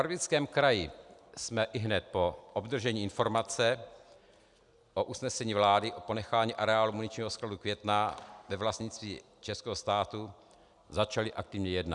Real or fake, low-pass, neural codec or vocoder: real; 10.8 kHz; none